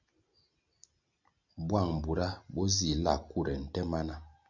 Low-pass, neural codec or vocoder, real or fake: 7.2 kHz; none; real